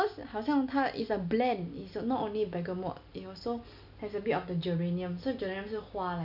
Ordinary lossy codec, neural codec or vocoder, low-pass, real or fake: none; none; 5.4 kHz; real